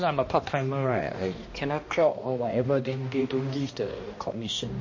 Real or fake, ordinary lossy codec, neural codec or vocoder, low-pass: fake; MP3, 32 kbps; codec, 16 kHz, 1 kbps, X-Codec, HuBERT features, trained on balanced general audio; 7.2 kHz